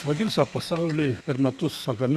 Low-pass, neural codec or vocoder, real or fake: 14.4 kHz; codec, 32 kHz, 1.9 kbps, SNAC; fake